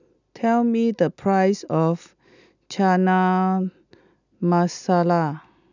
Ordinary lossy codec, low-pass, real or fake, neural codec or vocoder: none; 7.2 kHz; real; none